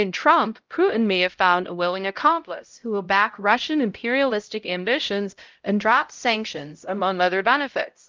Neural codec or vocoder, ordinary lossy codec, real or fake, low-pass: codec, 16 kHz, 0.5 kbps, X-Codec, HuBERT features, trained on LibriSpeech; Opus, 32 kbps; fake; 7.2 kHz